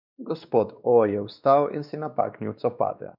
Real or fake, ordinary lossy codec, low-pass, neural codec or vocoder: fake; none; 5.4 kHz; codec, 16 kHz, 2 kbps, X-Codec, WavLM features, trained on Multilingual LibriSpeech